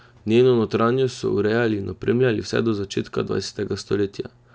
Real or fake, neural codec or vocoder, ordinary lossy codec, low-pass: real; none; none; none